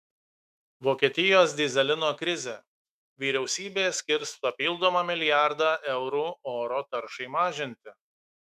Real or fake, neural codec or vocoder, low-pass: fake; autoencoder, 48 kHz, 128 numbers a frame, DAC-VAE, trained on Japanese speech; 14.4 kHz